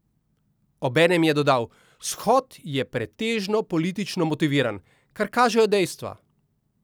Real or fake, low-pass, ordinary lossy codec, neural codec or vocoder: real; none; none; none